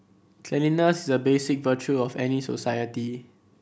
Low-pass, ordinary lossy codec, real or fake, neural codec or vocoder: none; none; real; none